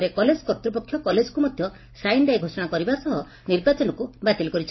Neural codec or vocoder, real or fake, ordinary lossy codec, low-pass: none; real; MP3, 24 kbps; 7.2 kHz